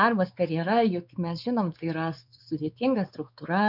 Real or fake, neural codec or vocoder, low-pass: fake; codec, 16 kHz, 4 kbps, X-Codec, WavLM features, trained on Multilingual LibriSpeech; 5.4 kHz